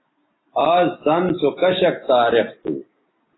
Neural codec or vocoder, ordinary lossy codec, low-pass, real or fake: none; AAC, 16 kbps; 7.2 kHz; real